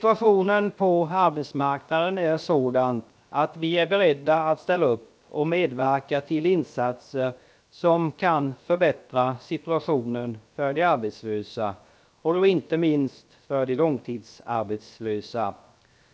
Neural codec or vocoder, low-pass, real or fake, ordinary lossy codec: codec, 16 kHz, 0.7 kbps, FocalCodec; none; fake; none